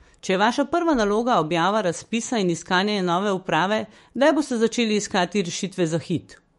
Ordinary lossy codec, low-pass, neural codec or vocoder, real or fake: MP3, 48 kbps; 19.8 kHz; autoencoder, 48 kHz, 128 numbers a frame, DAC-VAE, trained on Japanese speech; fake